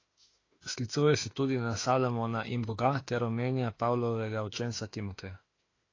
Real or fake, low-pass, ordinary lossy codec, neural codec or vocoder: fake; 7.2 kHz; AAC, 32 kbps; autoencoder, 48 kHz, 32 numbers a frame, DAC-VAE, trained on Japanese speech